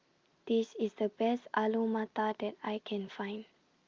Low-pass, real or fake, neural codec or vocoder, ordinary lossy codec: 7.2 kHz; real; none; Opus, 32 kbps